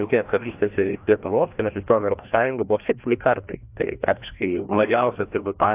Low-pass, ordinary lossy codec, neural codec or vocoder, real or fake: 3.6 kHz; AAC, 32 kbps; codec, 16 kHz, 1 kbps, FreqCodec, larger model; fake